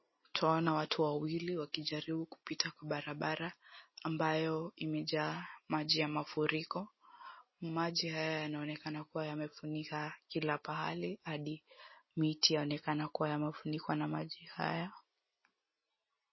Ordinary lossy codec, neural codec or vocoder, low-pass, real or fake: MP3, 24 kbps; none; 7.2 kHz; real